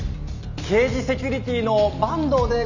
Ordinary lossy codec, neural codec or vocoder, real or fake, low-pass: none; none; real; 7.2 kHz